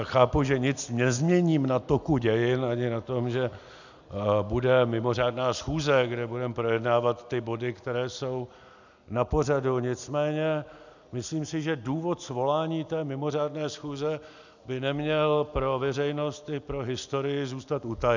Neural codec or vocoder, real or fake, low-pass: none; real; 7.2 kHz